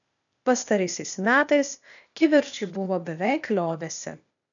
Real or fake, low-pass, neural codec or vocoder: fake; 7.2 kHz; codec, 16 kHz, 0.8 kbps, ZipCodec